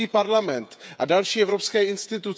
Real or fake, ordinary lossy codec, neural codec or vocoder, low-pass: fake; none; codec, 16 kHz, 8 kbps, FreqCodec, smaller model; none